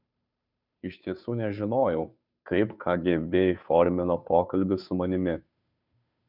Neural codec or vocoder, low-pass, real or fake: codec, 16 kHz, 2 kbps, FunCodec, trained on Chinese and English, 25 frames a second; 5.4 kHz; fake